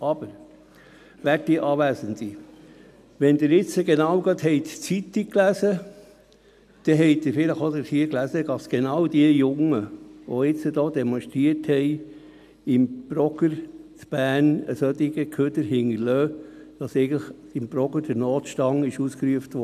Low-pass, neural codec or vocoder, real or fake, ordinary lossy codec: 14.4 kHz; none; real; none